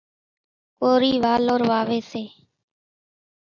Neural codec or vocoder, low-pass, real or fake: none; 7.2 kHz; real